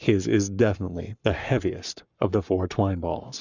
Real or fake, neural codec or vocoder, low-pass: fake; codec, 44.1 kHz, 7.8 kbps, Pupu-Codec; 7.2 kHz